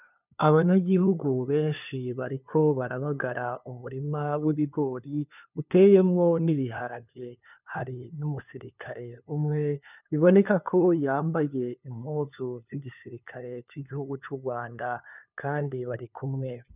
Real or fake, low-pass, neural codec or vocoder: fake; 3.6 kHz; codec, 16 kHz, 2 kbps, FunCodec, trained on LibriTTS, 25 frames a second